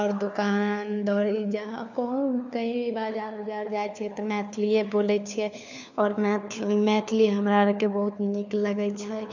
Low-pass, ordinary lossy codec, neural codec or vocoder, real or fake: 7.2 kHz; none; codec, 16 kHz, 4 kbps, FunCodec, trained on LibriTTS, 50 frames a second; fake